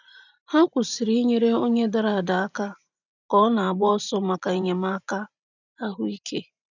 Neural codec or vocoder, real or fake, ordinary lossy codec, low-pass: vocoder, 44.1 kHz, 128 mel bands every 512 samples, BigVGAN v2; fake; none; 7.2 kHz